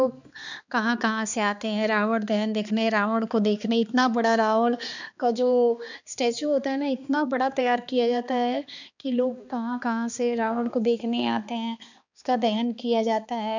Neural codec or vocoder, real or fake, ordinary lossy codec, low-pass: codec, 16 kHz, 2 kbps, X-Codec, HuBERT features, trained on balanced general audio; fake; none; 7.2 kHz